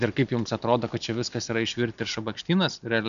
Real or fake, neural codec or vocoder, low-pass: real; none; 7.2 kHz